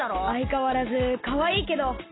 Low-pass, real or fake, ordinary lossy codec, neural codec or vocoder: 7.2 kHz; real; AAC, 16 kbps; none